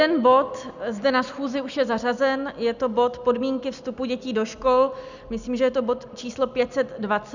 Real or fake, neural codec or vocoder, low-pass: real; none; 7.2 kHz